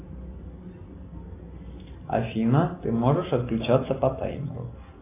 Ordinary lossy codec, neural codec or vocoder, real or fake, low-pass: AAC, 24 kbps; none; real; 3.6 kHz